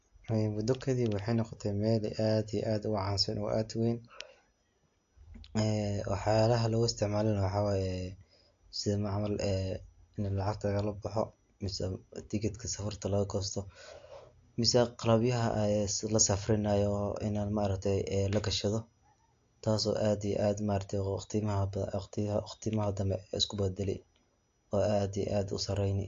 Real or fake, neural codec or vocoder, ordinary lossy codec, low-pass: real; none; AAC, 48 kbps; 7.2 kHz